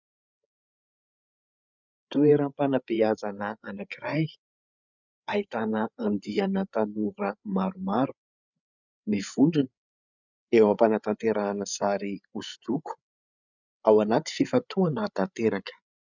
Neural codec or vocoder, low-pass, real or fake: codec, 16 kHz, 8 kbps, FreqCodec, larger model; 7.2 kHz; fake